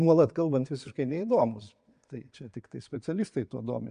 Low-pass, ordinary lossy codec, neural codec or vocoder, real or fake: 9.9 kHz; MP3, 64 kbps; vocoder, 22.05 kHz, 80 mel bands, Vocos; fake